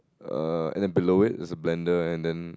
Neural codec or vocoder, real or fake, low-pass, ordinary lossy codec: none; real; none; none